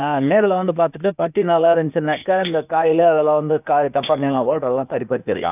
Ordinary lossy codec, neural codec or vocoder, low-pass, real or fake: none; codec, 16 kHz, 0.8 kbps, ZipCodec; 3.6 kHz; fake